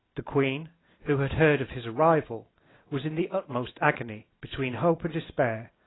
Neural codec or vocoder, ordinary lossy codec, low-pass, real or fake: none; AAC, 16 kbps; 7.2 kHz; real